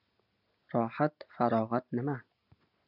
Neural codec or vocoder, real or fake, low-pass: none; real; 5.4 kHz